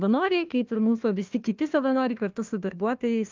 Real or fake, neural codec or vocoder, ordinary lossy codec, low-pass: fake; codec, 16 kHz, 1 kbps, FunCodec, trained on Chinese and English, 50 frames a second; Opus, 24 kbps; 7.2 kHz